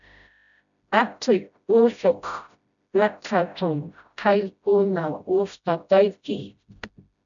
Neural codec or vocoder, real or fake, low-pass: codec, 16 kHz, 0.5 kbps, FreqCodec, smaller model; fake; 7.2 kHz